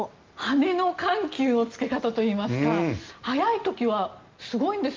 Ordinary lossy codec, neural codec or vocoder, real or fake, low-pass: Opus, 24 kbps; none; real; 7.2 kHz